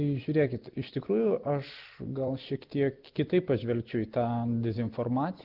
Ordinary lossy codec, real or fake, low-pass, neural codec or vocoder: Opus, 16 kbps; real; 5.4 kHz; none